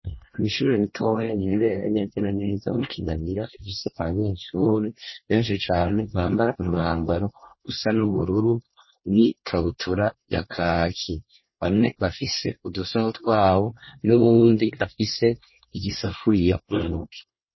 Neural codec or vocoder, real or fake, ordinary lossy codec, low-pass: codec, 24 kHz, 1 kbps, SNAC; fake; MP3, 24 kbps; 7.2 kHz